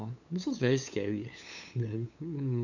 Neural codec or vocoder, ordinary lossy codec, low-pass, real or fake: codec, 16 kHz, 8 kbps, FunCodec, trained on LibriTTS, 25 frames a second; none; 7.2 kHz; fake